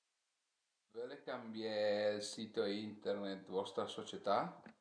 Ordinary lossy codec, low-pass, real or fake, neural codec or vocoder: none; 9.9 kHz; real; none